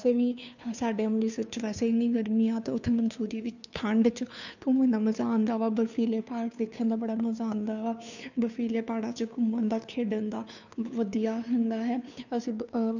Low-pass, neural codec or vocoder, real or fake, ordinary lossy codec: 7.2 kHz; codec, 16 kHz, 2 kbps, FunCodec, trained on Chinese and English, 25 frames a second; fake; none